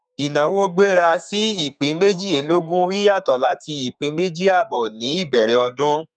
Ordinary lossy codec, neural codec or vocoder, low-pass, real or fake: none; codec, 32 kHz, 1.9 kbps, SNAC; 9.9 kHz; fake